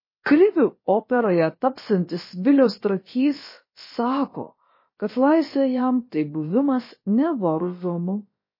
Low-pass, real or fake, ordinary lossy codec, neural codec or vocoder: 5.4 kHz; fake; MP3, 24 kbps; codec, 16 kHz, about 1 kbps, DyCAST, with the encoder's durations